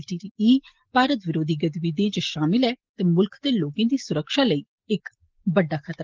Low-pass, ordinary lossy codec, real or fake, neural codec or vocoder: 7.2 kHz; Opus, 16 kbps; real; none